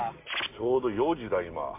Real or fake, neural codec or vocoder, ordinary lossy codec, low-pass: real; none; MP3, 24 kbps; 3.6 kHz